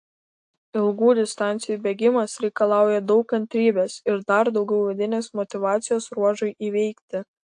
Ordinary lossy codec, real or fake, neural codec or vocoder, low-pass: AAC, 64 kbps; real; none; 9.9 kHz